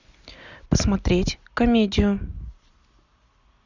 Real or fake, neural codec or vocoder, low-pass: real; none; 7.2 kHz